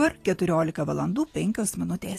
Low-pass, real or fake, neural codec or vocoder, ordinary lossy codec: 14.4 kHz; fake; vocoder, 44.1 kHz, 128 mel bands every 512 samples, BigVGAN v2; AAC, 48 kbps